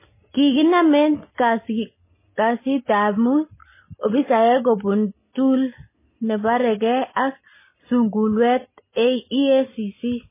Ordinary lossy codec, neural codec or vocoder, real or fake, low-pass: MP3, 16 kbps; none; real; 3.6 kHz